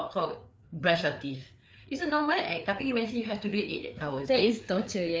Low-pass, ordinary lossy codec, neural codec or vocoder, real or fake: none; none; codec, 16 kHz, 4 kbps, FreqCodec, larger model; fake